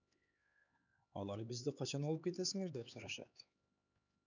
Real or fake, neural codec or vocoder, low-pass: fake; codec, 16 kHz, 4 kbps, X-Codec, HuBERT features, trained on LibriSpeech; 7.2 kHz